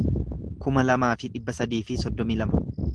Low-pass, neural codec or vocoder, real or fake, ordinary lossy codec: 9.9 kHz; none; real; Opus, 16 kbps